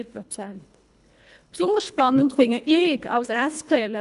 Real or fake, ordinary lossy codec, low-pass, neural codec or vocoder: fake; none; 10.8 kHz; codec, 24 kHz, 1.5 kbps, HILCodec